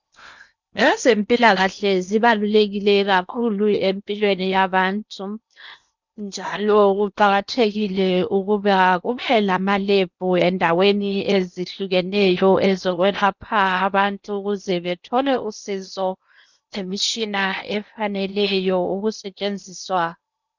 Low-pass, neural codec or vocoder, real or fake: 7.2 kHz; codec, 16 kHz in and 24 kHz out, 0.8 kbps, FocalCodec, streaming, 65536 codes; fake